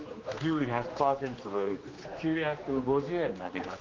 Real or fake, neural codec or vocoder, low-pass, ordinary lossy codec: fake; codec, 16 kHz, 2 kbps, X-Codec, HuBERT features, trained on general audio; 7.2 kHz; Opus, 24 kbps